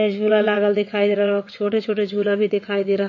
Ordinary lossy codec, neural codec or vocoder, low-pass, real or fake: MP3, 32 kbps; vocoder, 22.05 kHz, 80 mel bands, WaveNeXt; 7.2 kHz; fake